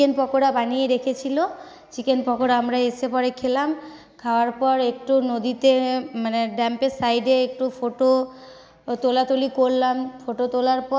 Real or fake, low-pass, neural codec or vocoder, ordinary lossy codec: real; none; none; none